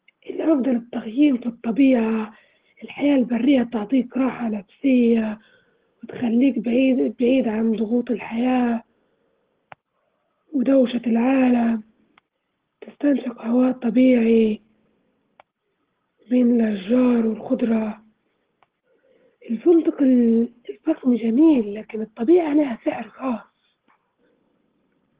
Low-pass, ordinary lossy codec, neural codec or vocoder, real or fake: 3.6 kHz; Opus, 16 kbps; none; real